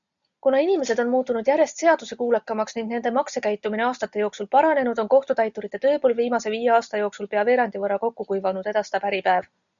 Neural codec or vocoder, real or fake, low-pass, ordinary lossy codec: none; real; 7.2 kHz; MP3, 96 kbps